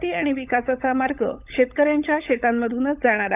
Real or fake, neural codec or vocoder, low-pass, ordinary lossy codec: fake; codec, 16 kHz, 16 kbps, FunCodec, trained on LibriTTS, 50 frames a second; 3.6 kHz; none